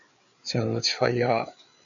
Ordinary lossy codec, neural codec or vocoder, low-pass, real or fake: MP3, 96 kbps; codec, 16 kHz, 4 kbps, FreqCodec, larger model; 7.2 kHz; fake